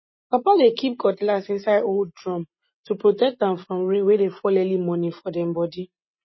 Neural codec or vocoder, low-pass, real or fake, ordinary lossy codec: none; 7.2 kHz; real; MP3, 24 kbps